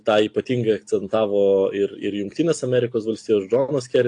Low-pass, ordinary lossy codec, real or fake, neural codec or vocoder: 9.9 kHz; AAC, 48 kbps; real; none